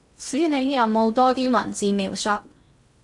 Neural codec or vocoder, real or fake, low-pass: codec, 16 kHz in and 24 kHz out, 0.6 kbps, FocalCodec, streaming, 2048 codes; fake; 10.8 kHz